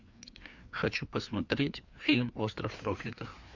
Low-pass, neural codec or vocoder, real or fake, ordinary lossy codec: 7.2 kHz; codec, 16 kHz, 2 kbps, FreqCodec, larger model; fake; MP3, 64 kbps